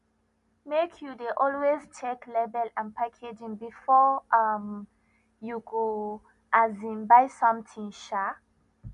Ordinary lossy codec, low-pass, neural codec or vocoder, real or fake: none; 10.8 kHz; none; real